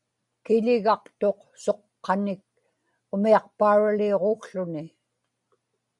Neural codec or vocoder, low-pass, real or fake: none; 10.8 kHz; real